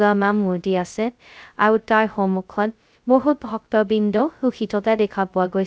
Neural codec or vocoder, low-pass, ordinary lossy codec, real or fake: codec, 16 kHz, 0.2 kbps, FocalCodec; none; none; fake